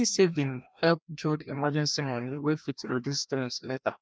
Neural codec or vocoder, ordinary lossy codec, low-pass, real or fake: codec, 16 kHz, 1 kbps, FreqCodec, larger model; none; none; fake